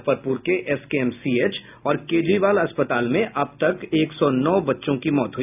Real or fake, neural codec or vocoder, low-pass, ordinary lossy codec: real; none; 3.6 kHz; none